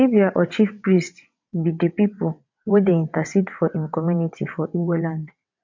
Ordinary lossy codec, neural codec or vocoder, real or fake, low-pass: MP3, 48 kbps; vocoder, 22.05 kHz, 80 mel bands, WaveNeXt; fake; 7.2 kHz